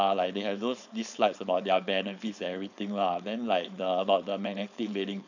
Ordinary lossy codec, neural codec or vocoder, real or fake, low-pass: none; codec, 16 kHz, 4.8 kbps, FACodec; fake; 7.2 kHz